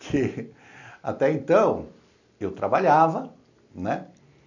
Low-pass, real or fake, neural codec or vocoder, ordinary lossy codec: 7.2 kHz; real; none; none